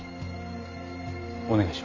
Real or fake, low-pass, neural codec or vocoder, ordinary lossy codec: real; 7.2 kHz; none; Opus, 32 kbps